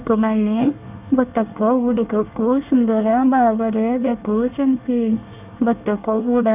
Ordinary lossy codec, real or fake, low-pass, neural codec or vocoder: none; fake; 3.6 kHz; codec, 24 kHz, 1 kbps, SNAC